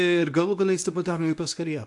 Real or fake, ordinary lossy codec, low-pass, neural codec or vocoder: fake; AAC, 64 kbps; 10.8 kHz; codec, 24 kHz, 0.9 kbps, WavTokenizer, medium speech release version 1